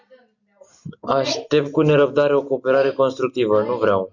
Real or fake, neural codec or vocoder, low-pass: real; none; 7.2 kHz